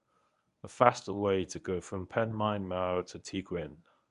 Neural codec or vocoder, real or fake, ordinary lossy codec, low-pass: codec, 24 kHz, 0.9 kbps, WavTokenizer, medium speech release version 1; fake; none; 10.8 kHz